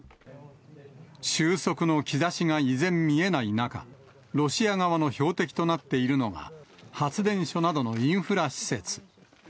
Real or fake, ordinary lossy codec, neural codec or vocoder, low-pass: real; none; none; none